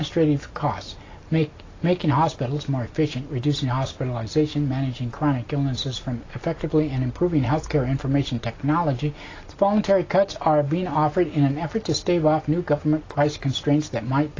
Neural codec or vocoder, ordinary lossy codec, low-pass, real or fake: none; AAC, 32 kbps; 7.2 kHz; real